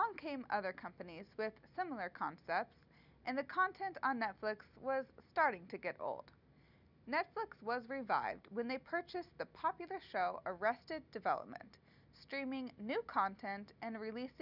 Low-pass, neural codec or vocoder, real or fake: 5.4 kHz; none; real